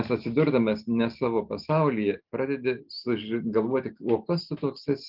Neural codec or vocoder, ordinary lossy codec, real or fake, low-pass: none; Opus, 24 kbps; real; 5.4 kHz